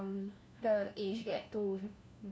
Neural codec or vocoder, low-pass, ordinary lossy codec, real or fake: codec, 16 kHz, 1 kbps, FunCodec, trained on LibriTTS, 50 frames a second; none; none; fake